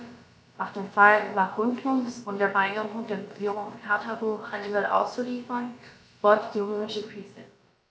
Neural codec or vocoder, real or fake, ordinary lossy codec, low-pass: codec, 16 kHz, about 1 kbps, DyCAST, with the encoder's durations; fake; none; none